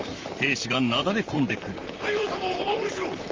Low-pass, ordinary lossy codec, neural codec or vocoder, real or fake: 7.2 kHz; Opus, 32 kbps; vocoder, 44.1 kHz, 128 mel bands, Pupu-Vocoder; fake